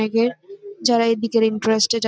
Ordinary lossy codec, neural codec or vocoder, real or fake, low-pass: none; none; real; none